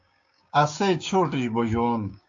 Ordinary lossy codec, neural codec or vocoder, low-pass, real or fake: AAC, 64 kbps; codec, 16 kHz, 6 kbps, DAC; 7.2 kHz; fake